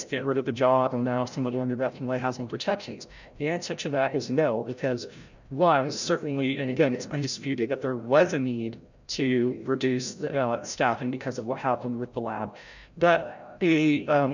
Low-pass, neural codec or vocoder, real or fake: 7.2 kHz; codec, 16 kHz, 0.5 kbps, FreqCodec, larger model; fake